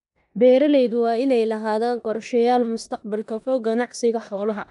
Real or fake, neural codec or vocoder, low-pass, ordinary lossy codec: fake; codec, 16 kHz in and 24 kHz out, 0.9 kbps, LongCat-Audio-Codec, four codebook decoder; 10.8 kHz; none